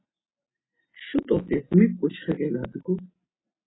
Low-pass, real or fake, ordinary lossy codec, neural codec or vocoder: 7.2 kHz; real; AAC, 16 kbps; none